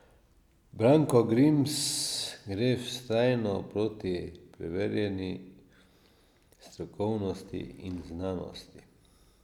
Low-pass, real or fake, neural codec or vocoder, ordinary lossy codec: 19.8 kHz; real; none; none